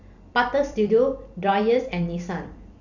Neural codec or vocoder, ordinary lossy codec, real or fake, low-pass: vocoder, 44.1 kHz, 128 mel bands every 256 samples, BigVGAN v2; none; fake; 7.2 kHz